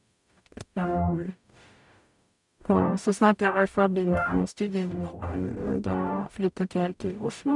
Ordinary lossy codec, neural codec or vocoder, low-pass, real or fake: none; codec, 44.1 kHz, 0.9 kbps, DAC; 10.8 kHz; fake